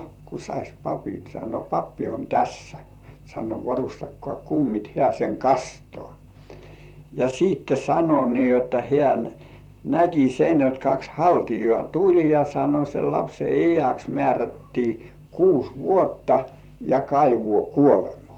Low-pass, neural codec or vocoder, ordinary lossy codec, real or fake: 19.8 kHz; vocoder, 44.1 kHz, 128 mel bands, Pupu-Vocoder; none; fake